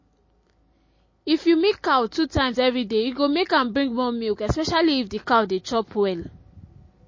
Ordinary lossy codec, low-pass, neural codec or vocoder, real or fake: MP3, 32 kbps; 7.2 kHz; none; real